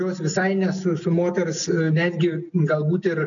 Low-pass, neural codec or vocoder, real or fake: 7.2 kHz; none; real